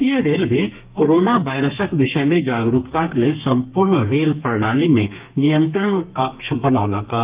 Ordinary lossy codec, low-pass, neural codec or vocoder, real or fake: Opus, 24 kbps; 3.6 kHz; codec, 32 kHz, 1.9 kbps, SNAC; fake